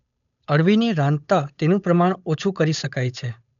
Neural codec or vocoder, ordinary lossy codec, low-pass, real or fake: codec, 16 kHz, 8 kbps, FunCodec, trained on Chinese and English, 25 frames a second; none; 7.2 kHz; fake